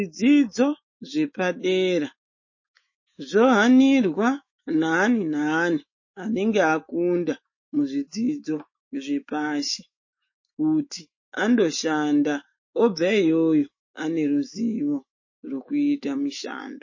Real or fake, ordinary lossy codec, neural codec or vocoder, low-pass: fake; MP3, 32 kbps; autoencoder, 48 kHz, 128 numbers a frame, DAC-VAE, trained on Japanese speech; 7.2 kHz